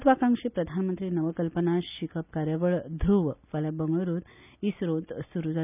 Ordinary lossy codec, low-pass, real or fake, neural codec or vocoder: none; 3.6 kHz; real; none